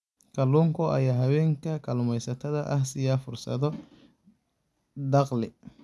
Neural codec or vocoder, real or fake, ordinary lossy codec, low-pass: none; real; none; none